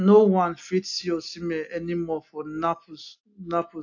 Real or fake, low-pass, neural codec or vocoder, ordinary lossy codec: real; 7.2 kHz; none; AAC, 48 kbps